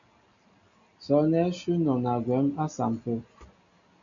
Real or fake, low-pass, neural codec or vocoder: real; 7.2 kHz; none